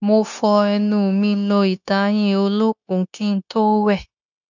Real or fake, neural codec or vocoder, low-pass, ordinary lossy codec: fake; codec, 16 kHz, 0.9 kbps, LongCat-Audio-Codec; 7.2 kHz; none